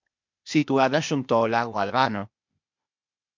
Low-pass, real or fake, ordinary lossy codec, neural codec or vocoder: 7.2 kHz; fake; MP3, 64 kbps; codec, 16 kHz, 0.8 kbps, ZipCodec